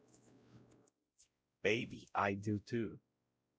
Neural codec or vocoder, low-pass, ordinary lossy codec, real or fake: codec, 16 kHz, 0.5 kbps, X-Codec, WavLM features, trained on Multilingual LibriSpeech; none; none; fake